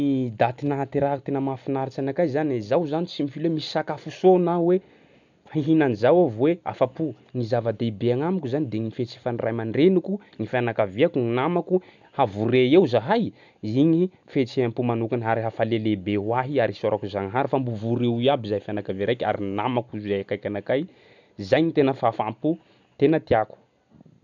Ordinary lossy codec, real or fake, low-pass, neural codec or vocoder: none; real; 7.2 kHz; none